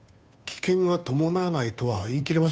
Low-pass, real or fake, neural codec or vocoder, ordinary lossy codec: none; fake; codec, 16 kHz, 2 kbps, FunCodec, trained on Chinese and English, 25 frames a second; none